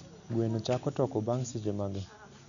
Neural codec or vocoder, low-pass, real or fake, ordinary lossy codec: none; 7.2 kHz; real; none